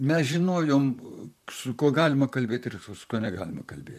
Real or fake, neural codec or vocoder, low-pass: fake; vocoder, 44.1 kHz, 128 mel bands, Pupu-Vocoder; 14.4 kHz